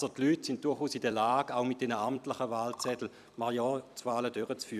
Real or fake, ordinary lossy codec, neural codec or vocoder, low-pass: fake; none; vocoder, 44.1 kHz, 128 mel bands every 256 samples, BigVGAN v2; 14.4 kHz